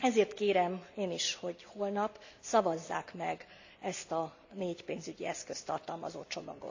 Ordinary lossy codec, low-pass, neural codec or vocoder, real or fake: AAC, 48 kbps; 7.2 kHz; none; real